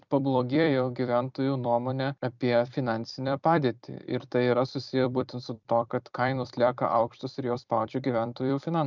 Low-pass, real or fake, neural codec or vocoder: 7.2 kHz; fake; vocoder, 44.1 kHz, 128 mel bands every 256 samples, BigVGAN v2